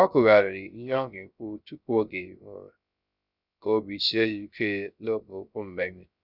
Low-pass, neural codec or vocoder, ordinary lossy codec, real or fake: 5.4 kHz; codec, 16 kHz, 0.3 kbps, FocalCodec; none; fake